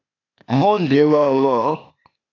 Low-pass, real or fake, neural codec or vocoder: 7.2 kHz; fake; codec, 16 kHz, 0.8 kbps, ZipCodec